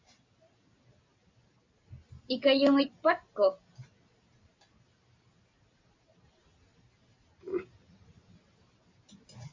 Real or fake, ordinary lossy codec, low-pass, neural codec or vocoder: real; MP3, 64 kbps; 7.2 kHz; none